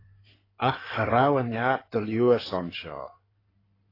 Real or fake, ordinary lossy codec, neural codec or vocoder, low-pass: fake; AAC, 24 kbps; codec, 16 kHz in and 24 kHz out, 2.2 kbps, FireRedTTS-2 codec; 5.4 kHz